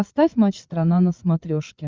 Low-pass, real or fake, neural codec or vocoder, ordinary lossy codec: 7.2 kHz; fake; codec, 16 kHz, 16 kbps, FreqCodec, larger model; Opus, 32 kbps